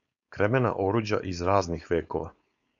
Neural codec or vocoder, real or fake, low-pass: codec, 16 kHz, 4.8 kbps, FACodec; fake; 7.2 kHz